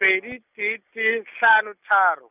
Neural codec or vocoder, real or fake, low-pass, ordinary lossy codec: none; real; 3.6 kHz; none